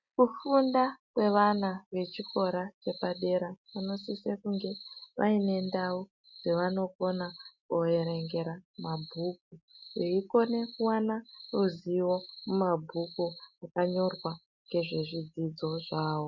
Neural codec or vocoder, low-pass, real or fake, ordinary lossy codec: none; 7.2 kHz; real; MP3, 64 kbps